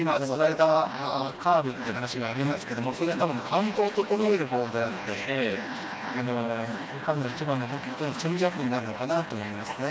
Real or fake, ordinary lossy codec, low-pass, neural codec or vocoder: fake; none; none; codec, 16 kHz, 1 kbps, FreqCodec, smaller model